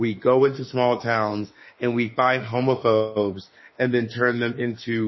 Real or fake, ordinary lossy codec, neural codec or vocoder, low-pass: fake; MP3, 24 kbps; autoencoder, 48 kHz, 32 numbers a frame, DAC-VAE, trained on Japanese speech; 7.2 kHz